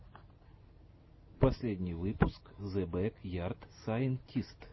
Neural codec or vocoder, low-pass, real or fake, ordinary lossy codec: none; 7.2 kHz; real; MP3, 24 kbps